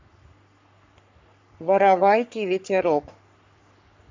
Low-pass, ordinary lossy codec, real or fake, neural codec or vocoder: 7.2 kHz; MP3, 64 kbps; fake; codec, 44.1 kHz, 3.4 kbps, Pupu-Codec